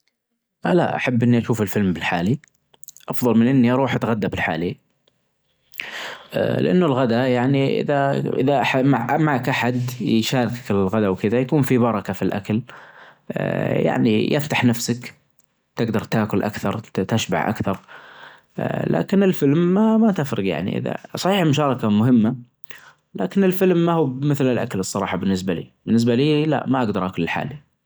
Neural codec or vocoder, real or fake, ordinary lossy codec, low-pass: none; real; none; none